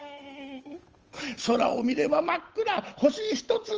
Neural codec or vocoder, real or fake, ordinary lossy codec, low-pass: codec, 16 kHz, 8 kbps, FunCodec, trained on Chinese and English, 25 frames a second; fake; Opus, 24 kbps; 7.2 kHz